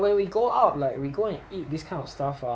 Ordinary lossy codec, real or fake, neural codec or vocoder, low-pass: none; fake; codec, 16 kHz, 4 kbps, X-Codec, WavLM features, trained on Multilingual LibriSpeech; none